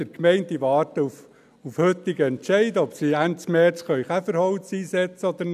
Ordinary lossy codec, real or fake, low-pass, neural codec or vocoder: none; real; 14.4 kHz; none